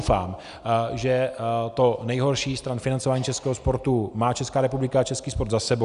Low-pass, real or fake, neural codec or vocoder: 10.8 kHz; real; none